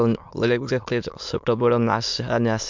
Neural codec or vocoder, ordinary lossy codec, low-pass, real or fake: autoencoder, 22.05 kHz, a latent of 192 numbers a frame, VITS, trained on many speakers; MP3, 64 kbps; 7.2 kHz; fake